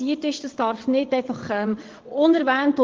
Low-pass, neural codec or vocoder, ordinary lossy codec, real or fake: 7.2 kHz; vocoder, 22.05 kHz, 80 mel bands, WaveNeXt; Opus, 16 kbps; fake